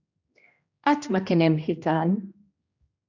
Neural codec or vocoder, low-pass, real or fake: codec, 16 kHz, 1 kbps, X-Codec, HuBERT features, trained on general audio; 7.2 kHz; fake